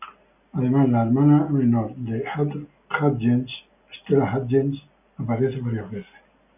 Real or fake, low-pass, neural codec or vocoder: real; 3.6 kHz; none